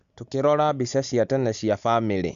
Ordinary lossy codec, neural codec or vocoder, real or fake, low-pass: none; none; real; 7.2 kHz